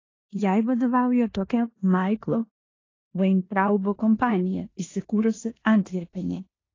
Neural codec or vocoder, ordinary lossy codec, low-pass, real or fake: codec, 16 kHz in and 24 kHz out, 0.9 kbps, LongCat-Audio-Codec, four codebook decoder; AAC, 32 kbps; 7.2 kHz; fake